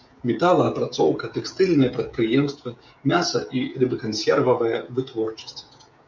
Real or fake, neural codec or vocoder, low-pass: fake; codec, 16 kHz, 16 kbps, FreqCodec, smaller model; 7.2 kHz